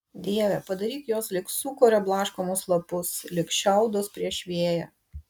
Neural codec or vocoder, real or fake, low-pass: none; real; 19.8 kHz